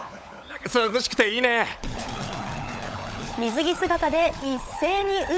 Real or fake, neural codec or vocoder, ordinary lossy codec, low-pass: fake; codec, 16 kHz, 16 kbps, FunCodec, trained on LibriTTS, 50 frames a second; none; none